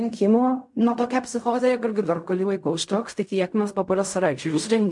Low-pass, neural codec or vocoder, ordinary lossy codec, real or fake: 10.8 kHz; codec, 16 kHz in and 24 kHz out, 0.4 kbps, LongCat-Audio-Codec, fine tuned four codebook decoder; MP3, 64 kbps; fake